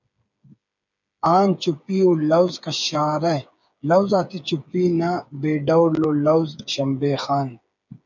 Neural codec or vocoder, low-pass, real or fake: codec, 16 kHz, 8 kbps, FreqCodec, smaller model; 7.2 kHz; fake